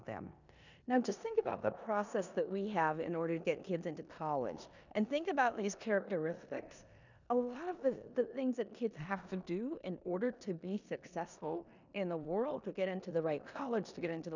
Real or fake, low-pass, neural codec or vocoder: fake; 7.2 kHz; codec, 16 kHz in and 24 kHz out, 0.9 kbps, LongCat-Audio-Codec, four codebook decoder